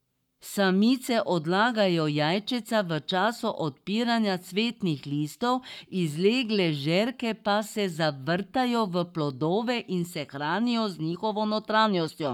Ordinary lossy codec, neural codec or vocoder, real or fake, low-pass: none; codec, 44.1 kHz, 7.8 kbps, Pupu-Codec; fake; 19.8 kHz